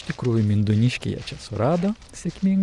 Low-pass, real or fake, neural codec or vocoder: 10.8 kHz; real; none